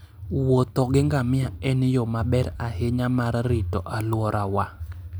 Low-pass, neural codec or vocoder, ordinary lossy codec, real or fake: none; none; none; real